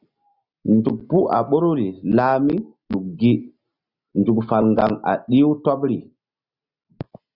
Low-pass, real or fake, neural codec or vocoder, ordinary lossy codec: 5.4 kHz; real; none; Opus, 64 kbps